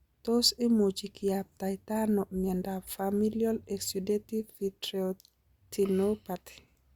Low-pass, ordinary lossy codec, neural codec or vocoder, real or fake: 19.8 kHz; Opus, 64 kbps; none; real